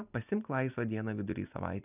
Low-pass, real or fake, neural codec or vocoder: 3.6 kHz; real; none